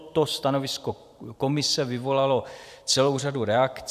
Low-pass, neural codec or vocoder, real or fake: 14.4 kHz; vocoder, 44.1 kHz, 128 mel bands every 512 samples, BigVGAN v2; fake